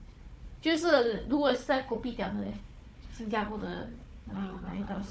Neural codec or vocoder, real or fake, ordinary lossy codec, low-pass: codec, 16 kHz, 4 kbps, FunCodec, trained on Chinese and English, 50 frames a second; fake; none; none